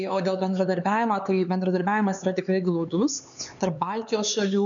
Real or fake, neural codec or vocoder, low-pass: fake; codec, 16 kHz, 4 kbps, X-Codec, HuBERT features, trained on LibriSpeech; 7.2 kHz